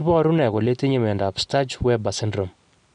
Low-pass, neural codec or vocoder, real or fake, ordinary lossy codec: 9.9 kHz; none; real; none